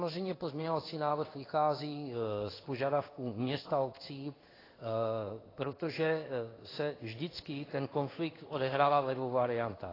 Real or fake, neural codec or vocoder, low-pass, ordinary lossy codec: fake; codec, 16 kHz in and 24 kHz out, 1 kbps, XY-Tokenizer; 5.4 kHz; AAC, 24 kbps